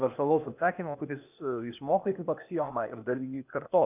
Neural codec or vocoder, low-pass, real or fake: codec, 16 kHz, 0.8 kbps, ZipCodec; 3.6 kHz; fake